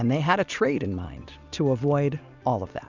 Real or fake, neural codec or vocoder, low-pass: real; none; 7.2 kHz